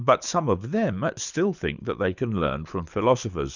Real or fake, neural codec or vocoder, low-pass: fake; codec, 24 kHz, 6 kbps, HILCodec; 7.2 kHz